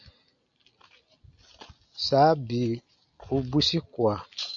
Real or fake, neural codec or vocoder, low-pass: real; none; 7.2 kHz